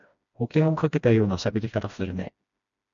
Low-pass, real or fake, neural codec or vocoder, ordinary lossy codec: 7.2 kHz; fake; codec, 16 kHz, 1 kbps, FreqCodec, smaller model; MP3, 64 kbps